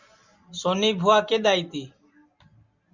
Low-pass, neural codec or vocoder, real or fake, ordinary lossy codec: 7.2 kHz; none; real; Opus, 64 kbps